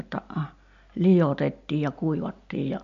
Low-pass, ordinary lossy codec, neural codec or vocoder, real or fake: 7.2 kHz; MP3, 48 kbps; none; real